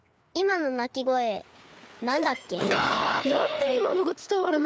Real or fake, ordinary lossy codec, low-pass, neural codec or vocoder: fake; none; none; codec, 16 kHz, 4 kbps, FreqCodec, larger model